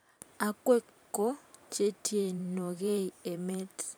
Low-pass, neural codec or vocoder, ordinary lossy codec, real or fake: none; vocoder, 44.1 kHz, 128 mel bands every 512 samples, BigVGAN v2; none; fake